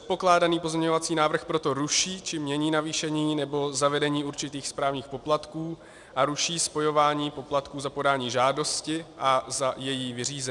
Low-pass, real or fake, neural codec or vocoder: 10.8 kHz; real; none